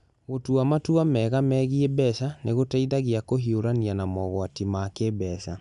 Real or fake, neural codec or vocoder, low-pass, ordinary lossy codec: real; none; 10.8 kHz; none